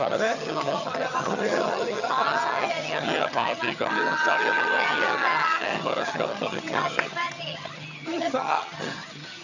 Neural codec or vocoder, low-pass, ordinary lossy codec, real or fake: vocoder, 22.05 kHz, 80 mel bands, HiFi-GAN; 7.2 kHz; none; fake